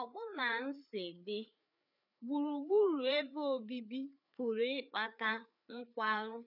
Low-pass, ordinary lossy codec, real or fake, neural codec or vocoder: 5.4 kHz; none; fake; codec, 16 kHz, 4 kbps, FreqCodec, larger model